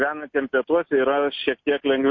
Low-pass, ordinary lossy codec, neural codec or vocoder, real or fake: 7.2 kHz; MP3, 32 kbps; none; real